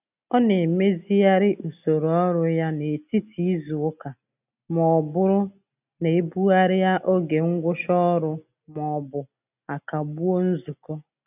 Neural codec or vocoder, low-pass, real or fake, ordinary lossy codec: none; 3.6 kHz; real; none